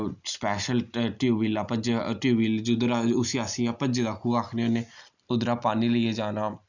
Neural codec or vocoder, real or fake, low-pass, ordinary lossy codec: none; real; 7.2 kHz; none